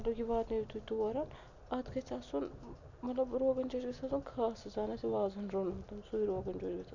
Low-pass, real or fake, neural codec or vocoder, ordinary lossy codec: 7.2 kHz; real; none; none